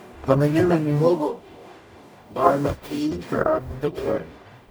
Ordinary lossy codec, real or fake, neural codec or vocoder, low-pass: none; fake; codec, 44.1 kHz, 0.9 kbps, DAC; none